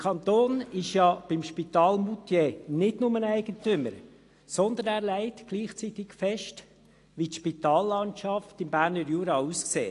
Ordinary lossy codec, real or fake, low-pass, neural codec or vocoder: AAC, 48 kbps; real; 10.8 kHz; none